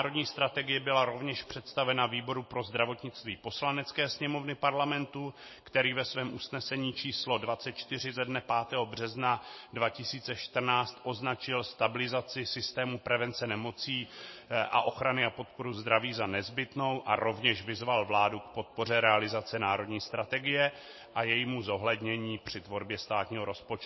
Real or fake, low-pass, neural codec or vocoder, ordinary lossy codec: real; 7.2 kHz; none; MP3, 24 kbps